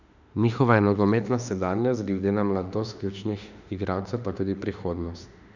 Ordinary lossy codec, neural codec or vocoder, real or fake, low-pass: none; autoencoder, 48 kHz, 32 numbers a frame, DAC-VAE, trained on Japanese speech; fake; 7.2 kHz